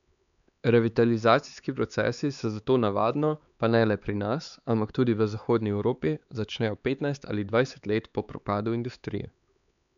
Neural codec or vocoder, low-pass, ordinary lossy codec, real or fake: codec, 16 kHz, 4 kbps, X-Codec, HuBERT features, trained on LibriSpeech; 7.2 kHz; none; fake